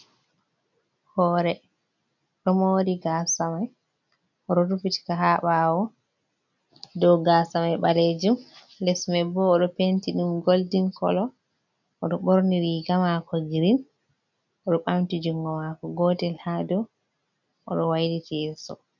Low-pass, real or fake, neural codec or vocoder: 7.2 kHz; real; none